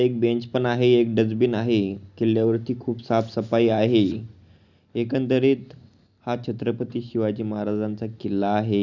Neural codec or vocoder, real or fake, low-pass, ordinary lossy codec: none; real; 7.2 kHz; none